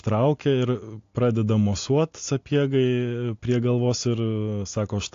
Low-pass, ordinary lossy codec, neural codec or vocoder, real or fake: 7.2 kHz; AAC, 48 kbps; none; real